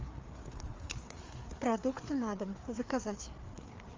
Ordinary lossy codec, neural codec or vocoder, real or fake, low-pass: Opus, 32 kbps; codec, 16 kHz, 4 kbps, FreqCodec, larger model; fake; 7.2 kHz